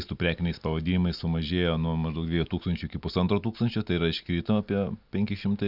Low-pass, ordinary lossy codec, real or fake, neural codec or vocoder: 5.4 kHz; Opus, 64 kbps; real; none